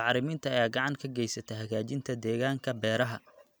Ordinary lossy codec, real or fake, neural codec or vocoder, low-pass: none; real; none; none